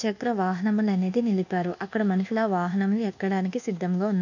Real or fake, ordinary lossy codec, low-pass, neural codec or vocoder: fake; none; 7.2 kHz; codec, 24 kHz, 1.2 kbps, DualCodec